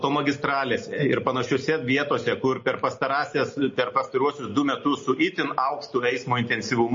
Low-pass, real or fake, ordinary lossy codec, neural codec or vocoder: 7.2 kHz; real; MP3, 32 kbps; none